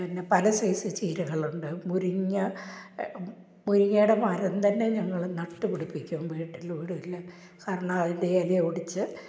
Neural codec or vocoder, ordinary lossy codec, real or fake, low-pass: none; none; real; none